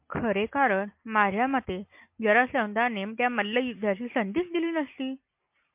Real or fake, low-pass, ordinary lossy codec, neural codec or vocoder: real; 3.6 kHz; MP3, 32 kbps; none